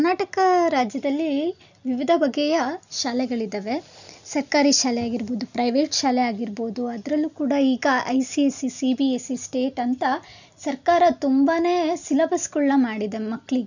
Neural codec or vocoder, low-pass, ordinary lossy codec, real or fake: none; 7.2 kHz; none; real